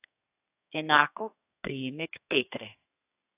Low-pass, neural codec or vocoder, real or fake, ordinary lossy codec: 3.6 kHz; codec, 32 kHz, 1.9 kbps, SNAC; fake; AAC, 24 kbps